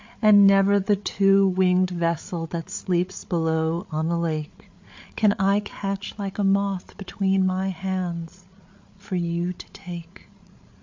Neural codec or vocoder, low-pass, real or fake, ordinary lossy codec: codec, 16 kHz, 8 kbps, FreqCodec, larger model; 7.2 kHz; fake; MP3, 48 kbps